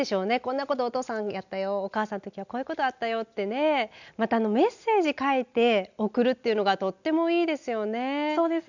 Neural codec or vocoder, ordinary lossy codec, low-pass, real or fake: none; none; 7.2 kHz; real